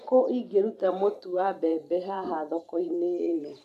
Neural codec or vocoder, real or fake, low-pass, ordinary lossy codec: vocoder, 44.1 kHz, 128 mel bands every 512 samples, BigVGAN v2; fake; 14.4 kHz; none